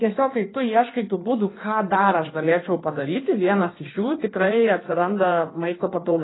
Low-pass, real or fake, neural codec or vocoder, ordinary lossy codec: 7.2 kHz; fake; codec, 16 kHz in and 24 kHz out, 1.1 kbps, FireRedTTS-2 codec; AAC, 16 kbps